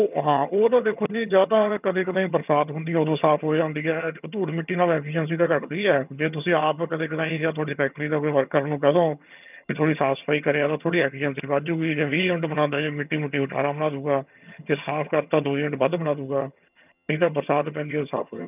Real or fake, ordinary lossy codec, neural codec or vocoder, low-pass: fake; none; vocoder, 22.05 kHz, 80 mel bands, HiFi-GAN; 3.6 kHz